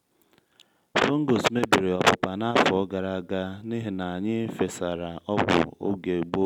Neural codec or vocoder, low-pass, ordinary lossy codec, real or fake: none; 19.8 kHz; none; real